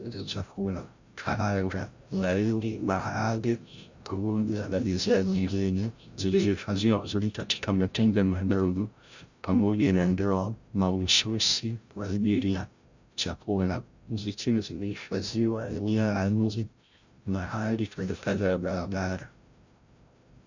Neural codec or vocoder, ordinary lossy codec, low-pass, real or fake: codec, 16 kHz, 0.5 kbps, FreqCodec, larger model; Opus, 64 kbps; 7.2 kHz; fake